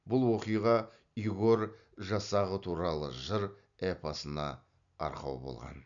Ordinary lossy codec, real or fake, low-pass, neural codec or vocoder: none; real; 7.2 kHz; none